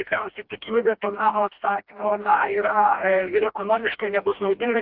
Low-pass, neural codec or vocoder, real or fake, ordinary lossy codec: 5.4 kHz; codec, 16 kHz, 1 kbps, FreqCodec, smaller model; fake; Opus, 32 kbps